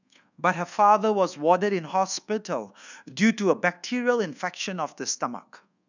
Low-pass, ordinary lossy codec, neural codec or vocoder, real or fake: 7.2 kHz; none; codec, 24 kHz, 1.2 kbps, DualCodec; fake